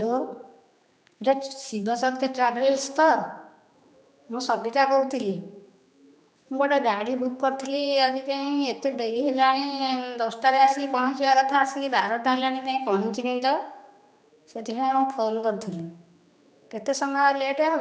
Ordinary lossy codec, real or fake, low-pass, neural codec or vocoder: none; fake; none; codec, 16 kHz, 2 kbps, X-Codec, HuBERT features, trained on general audio